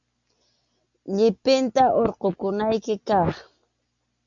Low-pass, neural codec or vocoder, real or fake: 7.2 kHz; none; real